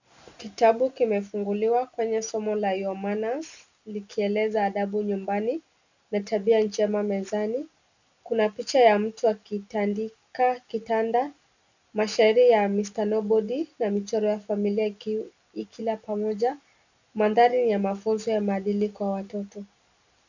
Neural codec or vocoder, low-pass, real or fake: none; 7.2 kHz; real